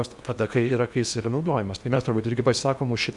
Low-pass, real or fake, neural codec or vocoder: 10.8 kHz; fake; codec, 16 kHz in and 24 kHz out, 0.8 kbps, FocalCodec, streaming, 65536 codes